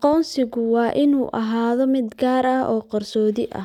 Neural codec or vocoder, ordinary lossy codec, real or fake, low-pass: none; none; real; 19.8 kHz